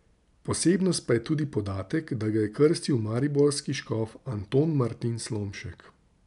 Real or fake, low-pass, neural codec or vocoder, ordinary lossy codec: real; 10.8 kHz; none; none